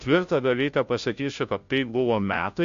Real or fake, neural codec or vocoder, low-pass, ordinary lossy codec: fake; codec, 16 kHz, 0.5 kbps, FunCodec, trained on Chinese and English, 25 frames a second; 7.2 kHz; AAC, 64 kbps